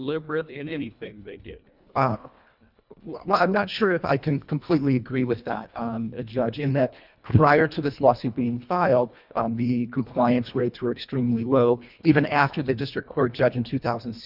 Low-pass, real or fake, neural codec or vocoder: 5.4 kHz; fake; codec, 24 kHz, 1.5 kbps, HILCodec